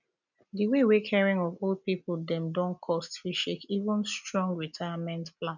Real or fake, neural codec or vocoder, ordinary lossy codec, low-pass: real; none; none; 7.2 kHz